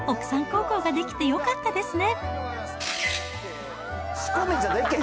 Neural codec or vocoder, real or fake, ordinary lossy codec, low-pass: none; real; none; none